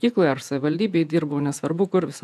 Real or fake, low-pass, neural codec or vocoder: fake; 14.4 kHz; vocoder, 48 kHz, 128 mel bands, Vocos